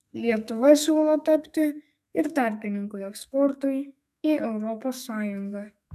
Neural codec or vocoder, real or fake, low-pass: codec, 32 kHz, 1.9 kbps, SNAC; fake; 14.4 kHz